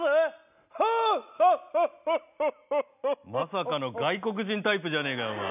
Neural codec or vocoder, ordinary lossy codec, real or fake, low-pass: none; none; real; 3.6 kHz